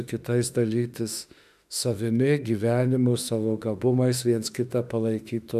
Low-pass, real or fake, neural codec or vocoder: 14.4 kHz; fake; autoencoder, 48 kHz, 32 numbers a frame, DAC-VAE, trained on Japanese speech